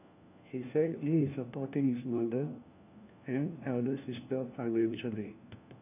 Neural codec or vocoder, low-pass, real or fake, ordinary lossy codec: codec, 16 kHz, 1 kbps, FunCodec, trained on LibriTTS, 50 frames a second; 3.6 kHz; fake; none